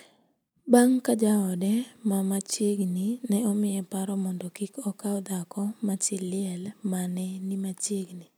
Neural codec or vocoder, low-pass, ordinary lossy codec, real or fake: none; none; none; real